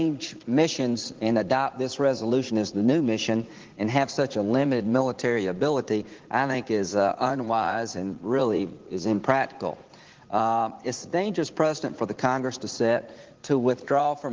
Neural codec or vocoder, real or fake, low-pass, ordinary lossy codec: vocoder, 44.1 kHz, 80 mel bands, Vocos; fake; 7.2 kHz; Opus, 16 kbps